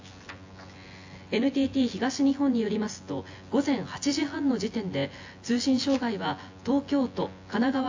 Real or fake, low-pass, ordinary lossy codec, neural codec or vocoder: fake; 7.2 kHz; AAC, 48 kbps; vocoder, 24 kHz, 100 mel bands, Vocos